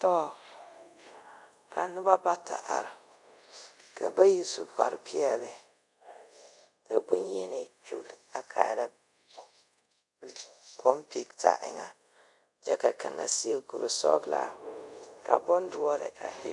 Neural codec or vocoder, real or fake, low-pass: codec, 24 kHz, 0.5 kbps, DualCodec; fake; 10.8 kHz